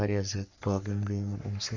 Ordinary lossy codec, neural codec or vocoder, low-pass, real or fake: none; codec, 44.1 kHz, 3.4 kbps, Pupu-Codec; 7.2 kHz; fake